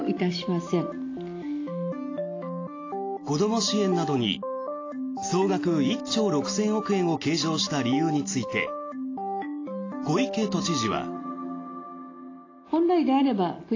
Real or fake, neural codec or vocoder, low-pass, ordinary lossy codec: real; none; 7.2 kHz; AAC, 32 kbps